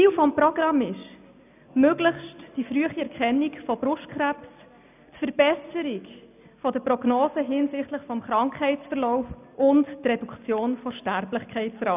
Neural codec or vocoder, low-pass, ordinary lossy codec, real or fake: none; 3.6 kHz; none; real